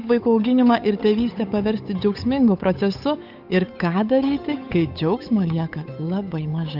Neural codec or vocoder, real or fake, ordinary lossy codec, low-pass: codec, 16 kHz, 8 kbps, FunCodec, trained on Chinese and English, 25 frames a second; fake; AAC, 48 kbps; 5.4 kHz